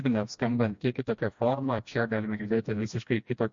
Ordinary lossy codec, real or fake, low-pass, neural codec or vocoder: MP3, 48 kbps; fake; 7.2 kHz; codec, 16 kHz, 1 kbps, FreqCodec, smaller model